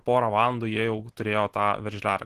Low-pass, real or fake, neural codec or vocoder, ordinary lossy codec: 14.4 kHz; real; none; Opus, 16 kbps